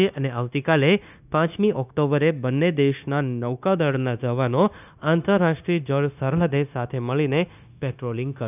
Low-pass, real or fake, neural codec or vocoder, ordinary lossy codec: 3.6 kHz; fake; codec, 16 kHz, 0.9 kbps, LongCat-Audio-Codec; none